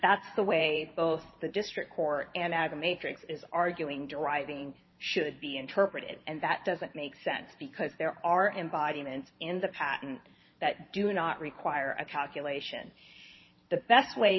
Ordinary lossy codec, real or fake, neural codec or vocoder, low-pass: MP3, 24 kbps; fake; vocoder, 44.1 kHz, 128 mel bands every 512 samples, BigVGAN v2; 7.2 kHz